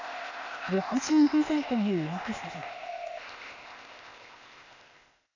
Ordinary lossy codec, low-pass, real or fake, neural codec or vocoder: none; 7.2 kHz; fake; codec, 16 kHz, 0.8 kbps, ZipCodec